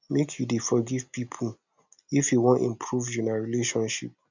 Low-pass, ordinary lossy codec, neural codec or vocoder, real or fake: 7.2 kHz; none; none; real